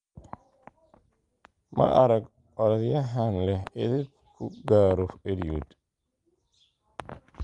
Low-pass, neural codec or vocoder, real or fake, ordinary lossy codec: 10.8 kHz; none; real; Opus, 32 kbps